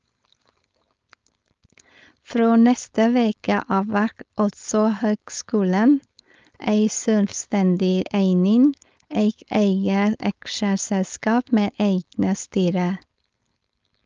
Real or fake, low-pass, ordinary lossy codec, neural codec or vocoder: fake; 7.2 kHz; Opus, 24 kbps; codec, 16 kHz, 4.8 kbps, FACodec